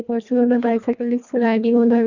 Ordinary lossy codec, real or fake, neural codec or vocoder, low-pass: none; fake; codec, 24 kHz, 1.5 kbps, HILCodec; 7.2 kHz